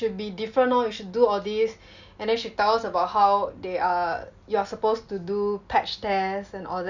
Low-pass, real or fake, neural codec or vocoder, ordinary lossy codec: 7.2 kHz; real; none; none